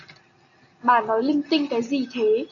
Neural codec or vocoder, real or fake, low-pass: none; real; 7.2 kHz